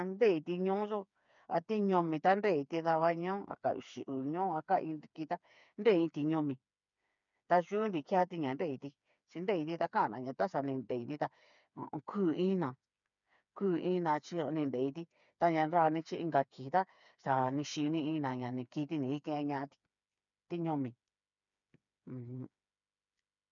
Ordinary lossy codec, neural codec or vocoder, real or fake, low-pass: none; codec, 16 kHz, 4 kbps, FreqCodec, smaller model; fake; 7.2 kHz